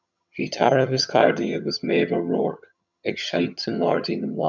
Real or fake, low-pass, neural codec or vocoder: fake; 7.2 kHz; vocoder, 22.05 kHz, 80 mel bands, HiFi-GAN